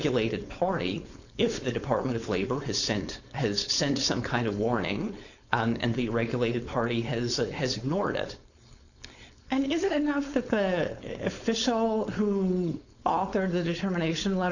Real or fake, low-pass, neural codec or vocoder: fake; 7.2 kHz; codec, 16 kHz, 4.8 kbps, FACodec